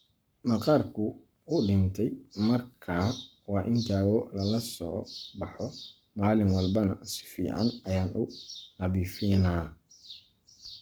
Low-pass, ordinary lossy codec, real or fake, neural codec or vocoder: none; none; fake; codec, 44.1 kHz, 7.8 kbps, Pupu-Codec